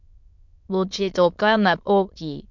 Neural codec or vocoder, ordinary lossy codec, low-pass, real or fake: autoencoder, 22.05 kHz, a latent of 192 numbers a frame, VITS, trained on many speakers; MP3, 64 kbps; 7.2 kHz; fake